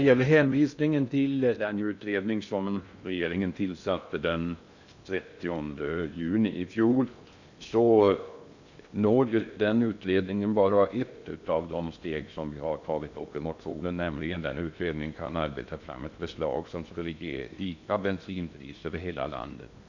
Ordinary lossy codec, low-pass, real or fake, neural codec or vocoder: none; 7.2 kHz; fake; codec, 16 kHz in and 24 kHz out, 0.6 kbps, FocalCodec, streaming, 2048 codes